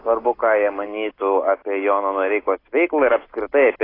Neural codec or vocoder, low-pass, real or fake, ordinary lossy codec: none; 5.4 kHz; real; AAC, 24 kbps